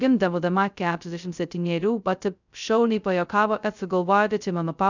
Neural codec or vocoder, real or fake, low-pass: codec, 16 kHz, 0.2 kbps, FocalCodec; fake; 7.2 kHz